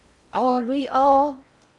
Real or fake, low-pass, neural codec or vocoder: fake; 10.8 kHz; codec, 16 kHz in and 24 kHz out, 0.6 kbps, FocalCodec, streaming, 4096 codes